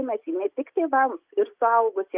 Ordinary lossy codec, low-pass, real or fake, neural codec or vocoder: Opus, 24 kbps; 3.6 kHz; real; none